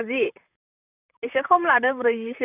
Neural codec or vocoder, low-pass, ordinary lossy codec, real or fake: vocoder, 44.1 kHz, 128 mel bands, Pupu-Vocoder; 3.6 kHz; none; fake